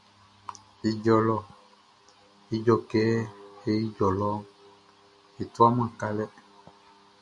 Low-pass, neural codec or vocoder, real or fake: 10.8 kHz; none; real